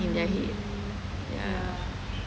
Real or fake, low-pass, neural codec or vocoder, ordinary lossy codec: real; none; none; none